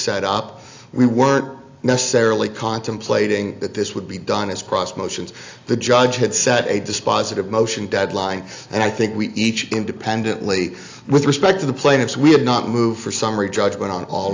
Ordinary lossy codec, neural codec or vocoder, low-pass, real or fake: AAC, 48 kbps; none; 7.2 kHz; real